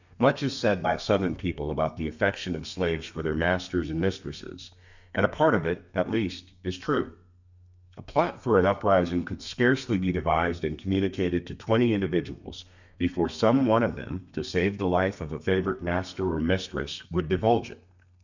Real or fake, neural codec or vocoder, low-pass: fake; codec, 32 kHz, 1.9 kbps, SNAC; 7.2 kHz